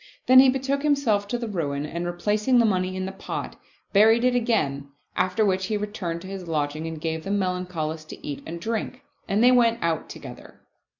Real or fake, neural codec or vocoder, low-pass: real; none; 7.2 kHz